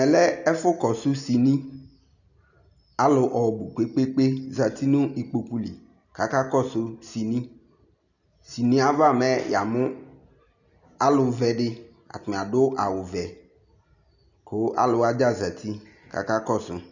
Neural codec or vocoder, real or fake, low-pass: none; real; 7.2 kHz